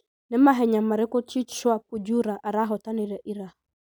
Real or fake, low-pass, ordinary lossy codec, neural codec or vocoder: real; none; none; none